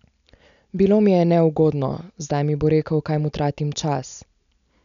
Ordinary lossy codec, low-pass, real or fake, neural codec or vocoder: none; 7.2 kHz; real; none